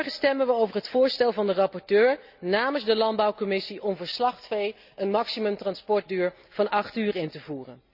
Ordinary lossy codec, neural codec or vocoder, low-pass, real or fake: Opus, 64 kbps; none; 5.4 kHz; real